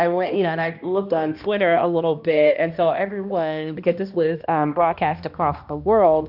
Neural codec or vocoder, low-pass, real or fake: codec, 16 kHz, 1 kbps, X-Codec, HuBERT features, trained on balanced general audio; 5.4 kHz; fake